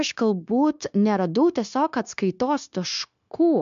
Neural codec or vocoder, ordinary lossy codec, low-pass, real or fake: codec, 16 kHz, 0.9 kbps, LongCat-Audio-Codec; MP3, 64 kbps; 7.2 kHz; fake